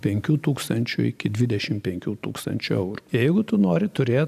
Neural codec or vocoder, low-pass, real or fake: vocoder, 44.1 kHz, 128 mel bands every 512 samples, BigVGAN v2; 14.4 kHz; fake